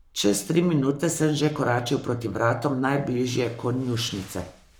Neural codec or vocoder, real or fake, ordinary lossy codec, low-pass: codec, 44.1 kHz, 7.8 kbps, Pupu-Codec; fake; none; none